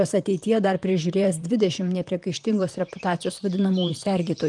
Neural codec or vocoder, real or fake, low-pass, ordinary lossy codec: vocoder, 44.1 kHz, 128 mel bands, Pupu-Vocoder; fake; 10.8 kHz; Opus, 32 kbps